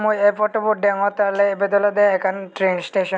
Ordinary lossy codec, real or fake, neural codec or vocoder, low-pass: none; real; none; none